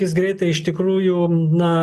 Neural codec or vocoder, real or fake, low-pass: none; real; 14.4 kHz